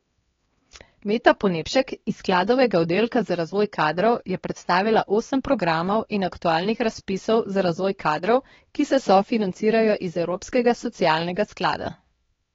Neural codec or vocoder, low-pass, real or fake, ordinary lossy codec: codec, 16 kHz, 2 kbps, X-Codec, HuBERT features, trained on LibriSpeech; 7.2 kHz; fake; AAC, 24 kbps